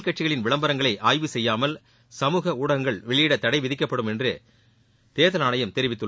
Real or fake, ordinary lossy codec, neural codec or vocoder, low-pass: real; none; none; none